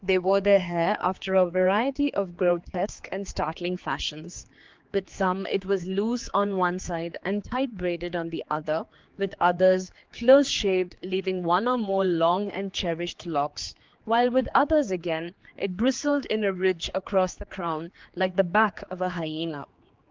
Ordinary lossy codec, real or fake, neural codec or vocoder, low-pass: Opus, 32 kbps; fake; codec, 16 kHz, 4 kbps, X-Codec, HuBERT features, trained on general audio; 7.2 kHz